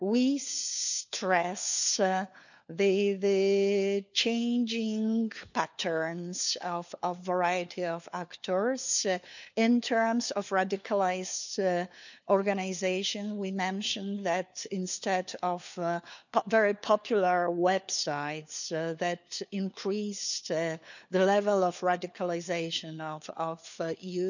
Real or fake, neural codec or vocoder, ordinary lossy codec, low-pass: fake; codec, 16 kHz, 4 kbps, FunCodec, trained on LibriTTS, 50 frames a second; none; 7.2 kHz